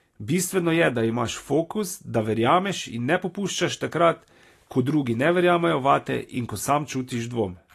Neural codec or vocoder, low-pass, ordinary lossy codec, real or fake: none; 14.4 kHz; AAC, 48 kbps; real